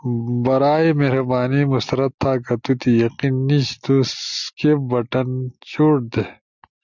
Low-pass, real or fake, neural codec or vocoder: 7.2 kHz; real; none